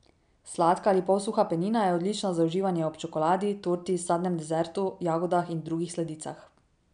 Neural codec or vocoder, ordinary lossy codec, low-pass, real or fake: none; none; 9.9 kHz; real